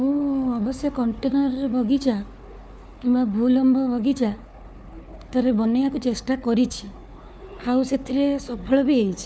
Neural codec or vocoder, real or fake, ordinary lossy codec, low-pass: codec, 16 kHz, 4 kbps, FunCodec, trained on Chinese and English, 50 frames a second; fake; none; none